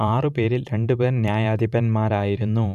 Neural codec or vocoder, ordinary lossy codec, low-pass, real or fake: vocoder, 44.1 kHz, 128 mel bands every 512 samples, BigVGAN v2; none; 14.4 kHz; fake